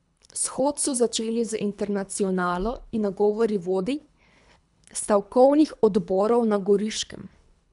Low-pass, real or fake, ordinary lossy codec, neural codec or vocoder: 10.8 kHz; fake; none; codec, 24 kHz, 3 kbps, HILCodec